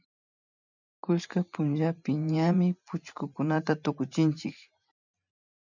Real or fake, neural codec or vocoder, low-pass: fake; vocoder, 44.1 kHz, 128 mel bands every 512 samples, BigVGAN v2; 7.2 kHz